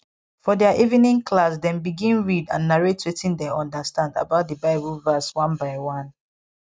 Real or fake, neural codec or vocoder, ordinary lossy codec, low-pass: real; none; none; none